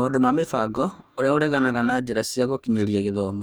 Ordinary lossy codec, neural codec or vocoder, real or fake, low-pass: none; codec, 44.1 kHz, 2.6 kbps, DAC; fake; none